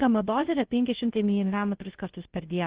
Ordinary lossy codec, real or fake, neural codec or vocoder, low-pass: Opus, 16 kbps; fake; codec, 16 kHz, 0.3 kbps, FocalCodec; 3.6 kHz